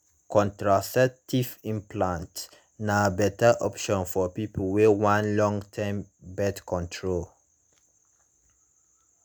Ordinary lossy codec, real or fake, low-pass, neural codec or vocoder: none; real; none; none